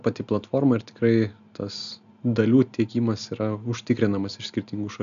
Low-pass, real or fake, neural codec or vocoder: 7.2 kHz; real; none